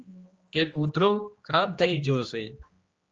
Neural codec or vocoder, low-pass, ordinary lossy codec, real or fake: codec, 16 kHz, 1 kbps, X-Codec, HuBERT features, trained on general audio; 7.2 kHz; Opus, 24 kbps; fake